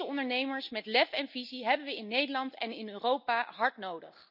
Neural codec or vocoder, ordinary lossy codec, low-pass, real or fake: none; none; 5.4 kHz; real